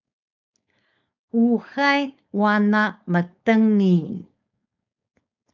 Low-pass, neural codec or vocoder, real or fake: 7.2 kHz; codec, 16 kHz, 4.8 kbps, FACodec; fake